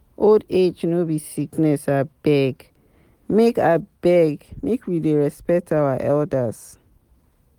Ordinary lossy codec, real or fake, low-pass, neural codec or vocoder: Opus, 24 kbps; real; 19.8 kHz; none